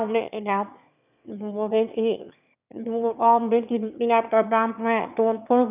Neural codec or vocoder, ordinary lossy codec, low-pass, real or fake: autoencoder, 22.05 kHz, a latent of 192 numbers a frame, VITS, trained on one speaker; none; 3.6 kHz; fake